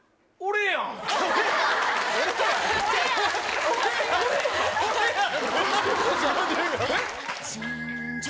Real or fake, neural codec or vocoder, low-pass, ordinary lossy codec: real; none; none; none